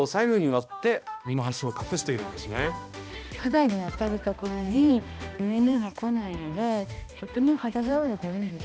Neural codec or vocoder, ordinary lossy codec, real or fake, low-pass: codec, 16 kHz, 1 kbps, X-Codec, HuBERT features, trained on balanced general audio; none; fake; none